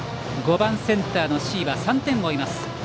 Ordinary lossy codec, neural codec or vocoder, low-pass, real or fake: none; none; none; real